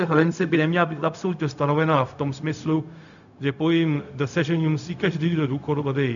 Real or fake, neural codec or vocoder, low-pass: fake; codec, 16 kHz, 0.4 kbps, LongCat-Audio-Codec; 7.2 kHz